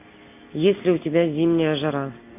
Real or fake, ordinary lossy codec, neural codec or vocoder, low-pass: real; MP3, 24 kbps; none; 3.6 kHz